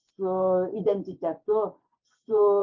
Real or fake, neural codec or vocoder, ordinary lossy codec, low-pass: fake; vocoder, 24 kHz, 100 mel bands, Vocos; MP3, 64 kbps; 7.2 kHz